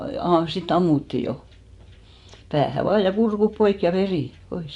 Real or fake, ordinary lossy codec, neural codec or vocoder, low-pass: fake; none; vocoder, 24 kHz, 100 mel bands, Vocos; 10.8 kHz